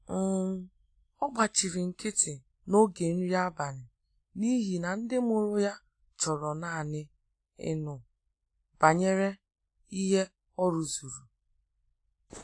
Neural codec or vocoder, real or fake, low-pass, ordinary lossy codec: none; real; 9.9 kHz; AAC, 48 kbps